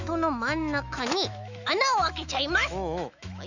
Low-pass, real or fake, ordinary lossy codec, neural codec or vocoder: 7.2 kHz; fake; none; autoencoder, 48 kHz, 128 numbers a frame, DAC-VAE, trained on Japanese speech